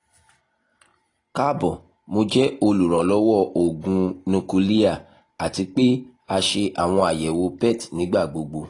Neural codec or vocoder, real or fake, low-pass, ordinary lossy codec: none; real; 10.8 kHz; AAC, 32 kbps